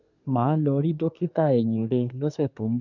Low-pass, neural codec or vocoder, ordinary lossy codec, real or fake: 7.2 kHz; codec, 24 kHz, 1 kbps, SNAC; none; fake